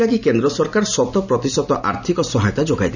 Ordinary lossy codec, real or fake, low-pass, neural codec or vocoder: none; real; 7.2 kHz; none